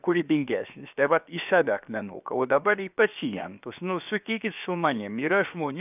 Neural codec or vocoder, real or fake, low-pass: codec, 16 kHz, about 1 kbps, DyCAST, with the encoder's durations; fake; 3.6 kHz